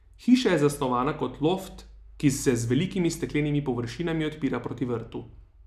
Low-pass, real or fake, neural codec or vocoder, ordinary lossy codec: 14.4 kHz; real; none; none